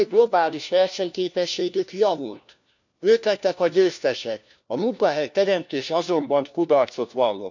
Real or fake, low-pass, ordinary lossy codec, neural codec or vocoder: fake; 7.2 kHz; none; codec, 16 kHz, 1 kbps, FunCodec, trained on LibriTTS, 50 frames a second